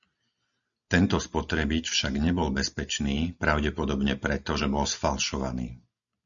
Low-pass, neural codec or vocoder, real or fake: 7.2 kHz; none; real